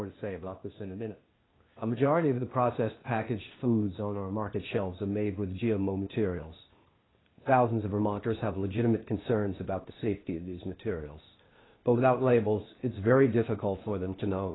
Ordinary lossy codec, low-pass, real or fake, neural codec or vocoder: AAC, 16 kbps; 7.2 kHz; fake; codec, 16 kHz, 0.8 kbps, ZipCodec